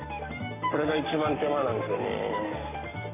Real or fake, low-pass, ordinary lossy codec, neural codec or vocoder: fake; 3.6 kHz; none; codec, 44.1 kHz, 7.8 kbps, Pupu-Codec